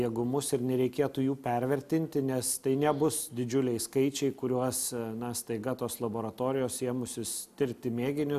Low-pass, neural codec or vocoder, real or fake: 14.4 kHz; none; real